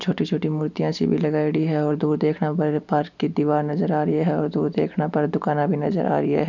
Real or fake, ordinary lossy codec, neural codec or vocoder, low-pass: real; none; none; 7.2 kHz